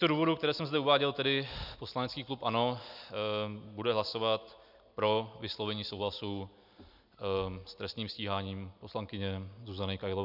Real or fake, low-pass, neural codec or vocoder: real; 5.4 kHz; none